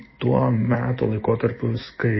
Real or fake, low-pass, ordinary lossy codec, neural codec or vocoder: fake; 7.2 kHz; MP3, 24 kbps; autoencoder, 48 kHz, 128 numbers a frame, DAC-VAE, trained on Japanese speech